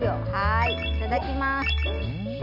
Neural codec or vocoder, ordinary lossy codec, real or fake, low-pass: none; none; real; 5.4 kHz